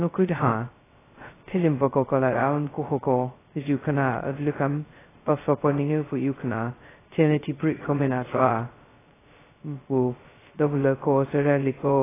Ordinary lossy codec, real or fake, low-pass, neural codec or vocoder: AAC, 16 kbps; fake; 3.6 kHz; codec, 16 kHz, 0.2 kbps, FocalCodec